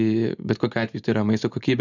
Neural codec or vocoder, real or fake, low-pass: none; real; 7.2 kHz